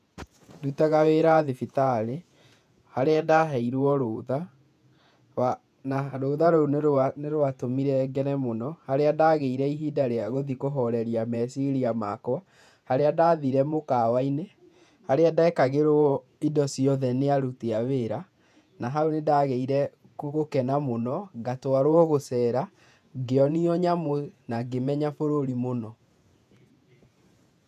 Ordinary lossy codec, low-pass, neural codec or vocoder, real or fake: none; 14.4 kHz; vocoder, 48 kHz, 128 mel bands, Vocos; fake